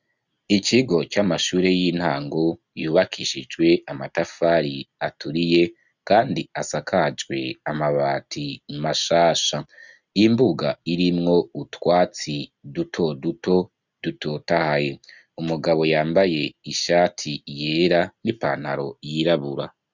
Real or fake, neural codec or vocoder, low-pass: real; none; 7.2 kHz